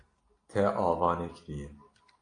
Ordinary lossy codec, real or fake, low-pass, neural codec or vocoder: AAC, 48 kbps; real; 9.9 kHz; none